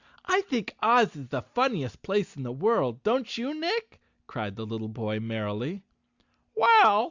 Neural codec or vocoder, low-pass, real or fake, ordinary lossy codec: none; 7.2 kHz; real; Opus, 64 kbps